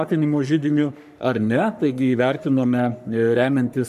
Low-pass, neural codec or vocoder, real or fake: 14.4 kHz; codec, 44.1 kHz, 3.4 kbps, Pupu-Codec; fake